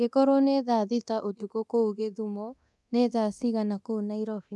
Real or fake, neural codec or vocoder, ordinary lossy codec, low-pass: fake; codec, 24 kHz, 0.9 kbps, DualCodec; none; none